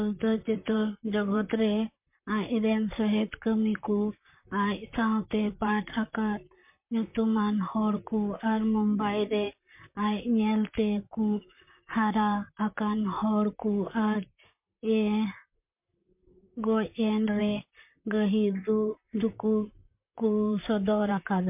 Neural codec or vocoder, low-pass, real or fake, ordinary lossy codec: vocoder, 44.1 kHz, 128 mel bands, Pupu-Vocoder; 3.6 kHz; fake; MP3, 32 kbps